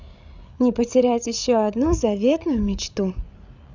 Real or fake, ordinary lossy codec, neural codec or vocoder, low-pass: fake; none; codec, 16 kHz, 8 kbps, FreqCodec, larger model; 7.2 kHz